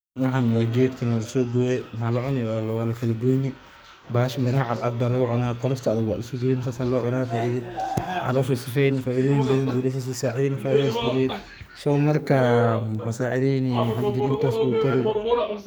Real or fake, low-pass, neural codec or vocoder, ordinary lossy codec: fake; none; codec, 44.1 kHz, 2.6 kbps, SNAC; none